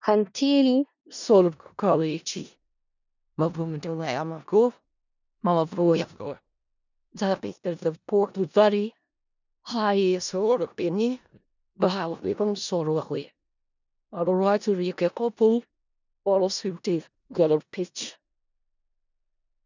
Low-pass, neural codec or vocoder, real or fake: 7.2 kHz; codec, 16 kHz in and 24 kHz out, 0.4 kbps, LongCat-Audio-Codec, four codebook decoder; fake